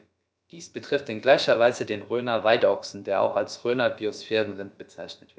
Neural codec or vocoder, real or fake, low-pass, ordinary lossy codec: codec, 16 kHz, about 1 kbps, DyCAST, with the encoder's durations; fake; none; none